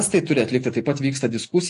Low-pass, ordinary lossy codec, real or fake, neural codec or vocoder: 10.8 kHz; AAC, 48 kbps; real; none